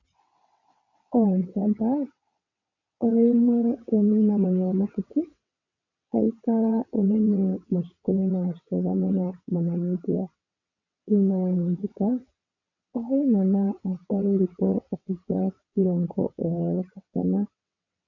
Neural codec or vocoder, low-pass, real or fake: vocoder, 22.05 kHz, 80 mel bands, WaveNeXt; 7.2 kHz; fake